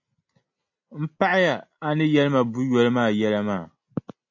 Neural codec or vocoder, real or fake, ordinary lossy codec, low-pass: none; real; AAC, 48 kbps; 7.2 kHz